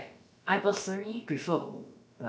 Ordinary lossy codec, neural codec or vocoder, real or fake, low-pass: none; codec, 16 kHz, about 1 kbps, DyCAST, with the encoder's durations; fake; none